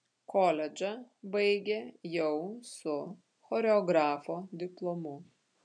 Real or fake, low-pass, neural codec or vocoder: real; 9.9 kHz; none